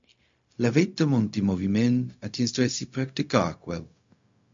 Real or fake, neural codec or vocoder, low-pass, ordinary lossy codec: fake; codec, 16 kHz, 0.4 kbps, LongCat-Audio-Codec; 7.2 kHz; MP3, 48 kbps